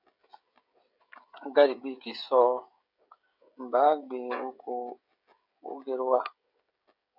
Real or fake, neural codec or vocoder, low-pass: fake; codec, 16 kHz, 16 kbps, FreqCodec, smaller model; 5.4 kHz